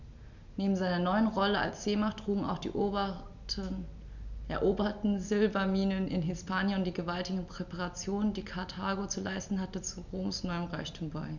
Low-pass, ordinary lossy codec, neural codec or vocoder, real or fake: 7.2 kHz; none; none; real